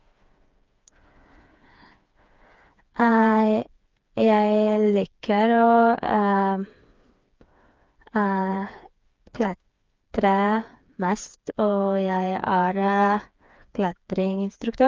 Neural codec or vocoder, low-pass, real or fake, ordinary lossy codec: codec, 16 kHz, 4 kbps, FreqCodec, smaller model; 7.2 kHz; fake; Opus, 24 kbps